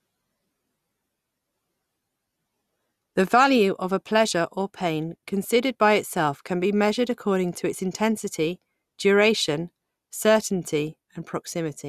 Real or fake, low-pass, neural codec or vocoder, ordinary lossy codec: real; 14.4 kHz; none; Opus, 64 kbps